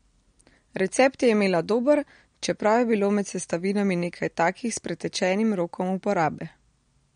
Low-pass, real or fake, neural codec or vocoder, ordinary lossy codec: 9.9 kHz; real; none; MP3, 48 kbps